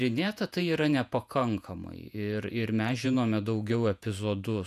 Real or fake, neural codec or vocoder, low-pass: fake; vocoder, 48 kHz, 128 mel bands, Vocos; 14.4 kHz